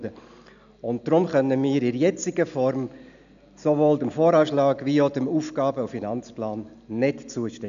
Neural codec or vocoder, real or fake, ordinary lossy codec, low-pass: none; real; none; 7.2 kHz